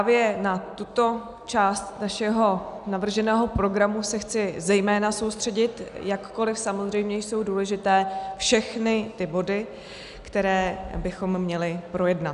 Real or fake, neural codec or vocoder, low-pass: real; none; 10.8 kHz